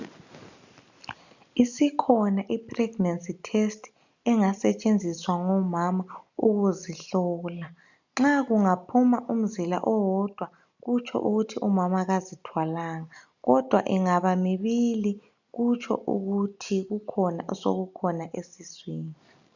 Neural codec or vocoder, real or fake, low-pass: none; real; 7.2 kHz